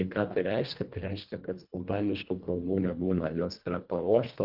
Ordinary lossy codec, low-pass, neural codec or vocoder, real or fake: Opus, 32 kbps; 5.4 kHz; codec, 24 kHz, 1.5 kbps, HILCodec; fake